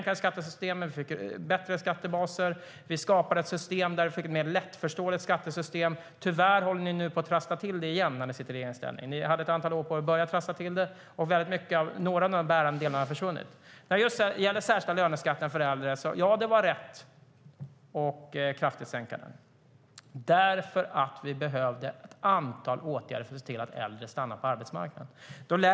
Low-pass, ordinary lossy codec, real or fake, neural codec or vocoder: none; none; real; none